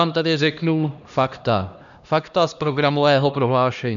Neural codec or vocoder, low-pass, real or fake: codec, 16 kHz, 1 kbps, X-Codec, HuBERT features, trained on LibriSpeech; 7.2 kHz; fake